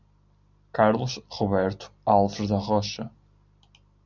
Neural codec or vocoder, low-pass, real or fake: none; 7.2 kHz; real